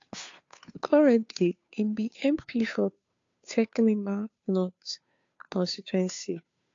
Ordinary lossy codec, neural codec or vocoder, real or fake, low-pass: AAC, 48 kbps; codec, 16 kHz, 2 kbps, FunCodec, trained on Chinese and English, 25 frames a second; fake; 7.2 kHz